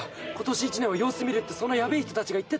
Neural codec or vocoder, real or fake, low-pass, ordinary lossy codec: none; real; none; none